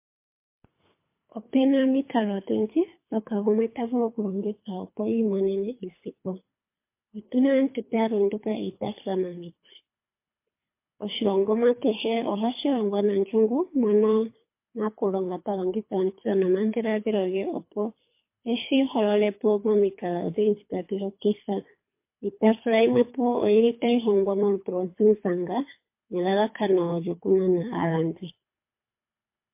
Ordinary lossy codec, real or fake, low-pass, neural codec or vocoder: MP3, 24 kbps; fake; 3.6 kHz; codec, 24 kHz, 3 kbps, HILCodec